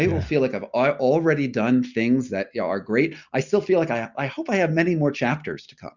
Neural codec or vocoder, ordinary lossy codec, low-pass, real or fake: none; Opus, 64 kbps; 7.2 kHz; real